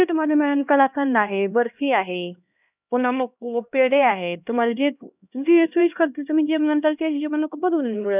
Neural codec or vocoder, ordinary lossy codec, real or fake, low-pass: codec, 16 kHz, 1 kbps, X-Codec, HuBERT features, trained on LibriSpeech; none; fake; 3.6 kHz